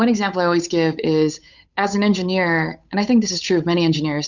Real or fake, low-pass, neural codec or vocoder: fake; 7.2 kHz; codec, 16 kHz, 8 kbps, FunCodec, trained on Chinese and English, 25 frames a second